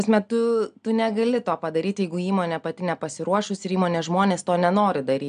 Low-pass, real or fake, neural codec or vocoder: 9.9 kHz; real; none